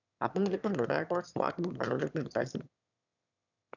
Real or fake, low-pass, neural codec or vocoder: fake; 7.2 kHz; autoencoder, 22.05 kHz, a latent of 192 numbers a frame, VITS, trained on one speaker